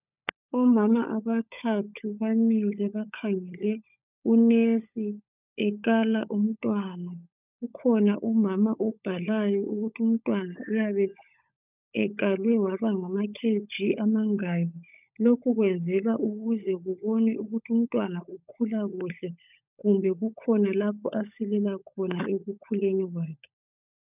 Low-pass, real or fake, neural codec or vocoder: 3.6 kHz; fake; codec, 16 kHz, 16 kbps, FunCodec, trained on LibriTTS, 50 frames a second